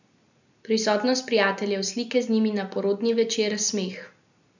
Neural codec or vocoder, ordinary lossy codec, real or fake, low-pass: none; none; real; 7.2 kHz